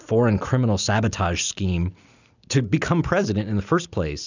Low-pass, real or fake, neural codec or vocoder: 7.2 kHz; real; none